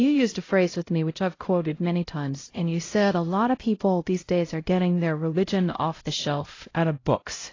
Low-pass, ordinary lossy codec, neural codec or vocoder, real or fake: 7.2 kHz; AAC, 32 kbps; codec, 16 kHz, 0.5 kbps, X-Codec, HuBERT features, trained on LibriSpeech; fake